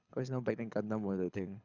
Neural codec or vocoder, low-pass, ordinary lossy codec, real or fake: codec, 24 kHz, 6 kbps, HILCodec; 7.2 kHz; none; fake